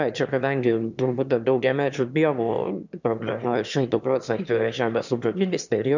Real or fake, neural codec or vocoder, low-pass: fake; autoencoder, 22.05 kHz, a latent of 192 numbers a frame, VITS, trained on one speaker; 7.2 kHz